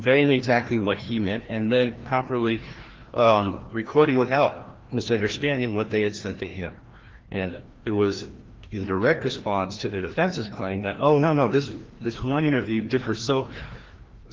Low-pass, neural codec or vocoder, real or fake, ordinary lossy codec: 7.2 kHz; codec, 16 kHz, 1 kbps, FreqCodec, larger model; fake; Opus, 16 kbps